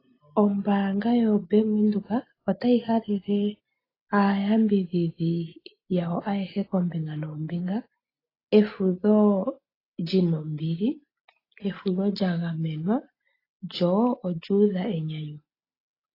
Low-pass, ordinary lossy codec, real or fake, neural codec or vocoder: 5.4 kHz; AAC, 24 kbps; real; none